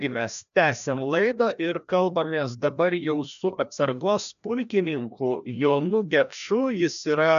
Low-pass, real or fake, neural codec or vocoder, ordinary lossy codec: 7.2 kHz; fake; codec, 16 kHz, 1 kbps, FreqCodec, larger model; AAC, 96 kbps